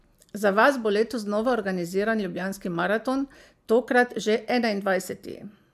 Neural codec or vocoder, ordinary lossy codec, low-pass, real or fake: none; MP3, 96 kbps; 14.4 kHz; real